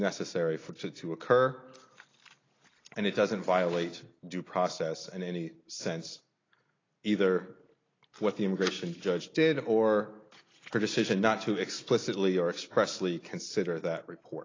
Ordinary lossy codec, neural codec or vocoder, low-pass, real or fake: AAC, 32 kbps; none; 7.2 kHz; real